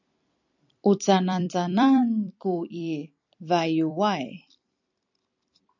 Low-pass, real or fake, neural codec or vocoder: 7.2 kHz; fake; vocoder, 44.1 kHz, 128 mel bands every 256 samples, BigVGAN v2